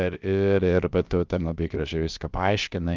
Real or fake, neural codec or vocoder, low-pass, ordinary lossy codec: fake; codec, 16 kHz, about 1 kbps, DyCAST, with the encoder's durations; 7.2 kHz; Opus, 24 kbps